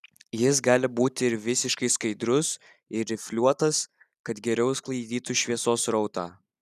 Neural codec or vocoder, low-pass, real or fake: none; 14.4 kHz; real